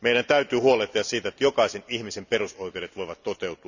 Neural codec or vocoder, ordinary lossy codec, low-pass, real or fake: none; none; 7.2 kHz; real